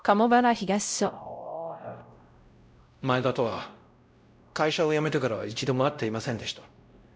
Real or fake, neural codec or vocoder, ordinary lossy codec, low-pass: fake; codec, 16 kHz, 0.5 kbps, X-Codec, WavLM features, trained on Multilingual LibriSpeech; none; none